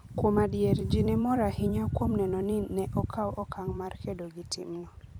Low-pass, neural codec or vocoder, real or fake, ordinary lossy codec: 19.8 kHz; vocoder, 44.1 kHz, 128 mel bands every 256 samples, BigVGAN v2; fake; none